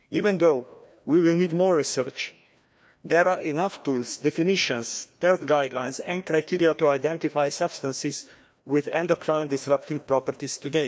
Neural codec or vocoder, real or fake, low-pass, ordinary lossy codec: codec, 16 kHz, 1 kbps, FreqCodec, larger model; fake; none; none